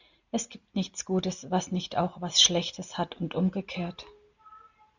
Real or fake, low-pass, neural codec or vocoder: real; 7.2 kHz; none